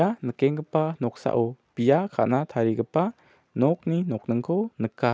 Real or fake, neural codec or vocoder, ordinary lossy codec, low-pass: real; none; none; none